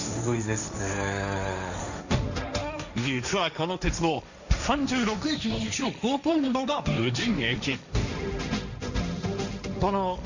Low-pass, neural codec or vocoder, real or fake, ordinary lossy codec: 7.2 kHz; codec, 16 kHz, 1.1 kbps, Voila-Tokenizer; fake; none